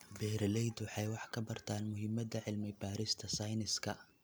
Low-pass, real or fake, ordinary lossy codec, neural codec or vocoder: none; real; none; none